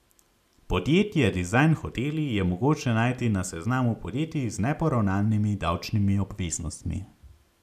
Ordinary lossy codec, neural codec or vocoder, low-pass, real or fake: none; none; 14.4 kHz; real